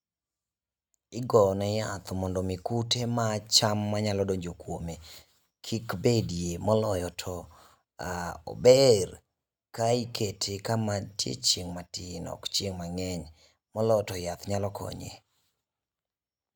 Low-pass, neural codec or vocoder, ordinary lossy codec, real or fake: none; none; none; real